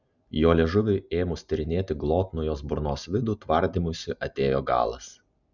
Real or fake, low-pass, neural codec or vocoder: real; 7.2 kHz; none